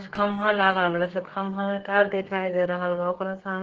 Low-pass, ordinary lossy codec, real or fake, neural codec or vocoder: 7.2 kHz; Opus, 16 kbps; fake; codec, 32 kHz, 1.9 kbps, SNAC